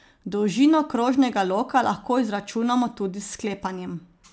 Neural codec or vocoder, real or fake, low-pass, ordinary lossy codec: none; real; none; none